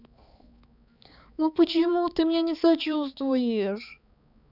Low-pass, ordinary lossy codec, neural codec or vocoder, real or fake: 5.4 kHz; none; codec, 16 kHz, 4 kbps, X-Codec, HuBERT features, trained on balanced general audio; fake